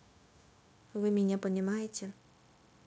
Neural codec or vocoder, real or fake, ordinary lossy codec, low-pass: codec, 16 kHz, 0.9 kbps, LongCat-Audio-Codec; fake; none; none